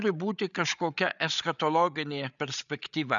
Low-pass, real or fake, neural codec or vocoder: 7.2 kHz; fake; codec, 16 kHz, 8 kbps, FreqCodec, larger model